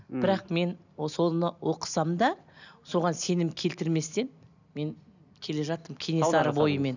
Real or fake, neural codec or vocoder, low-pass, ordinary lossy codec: real; none; 7.2 kHz; none